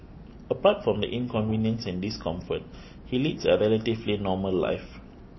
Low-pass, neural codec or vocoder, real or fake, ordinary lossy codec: 7.2 kHz; none; real; MP3, 24 kbps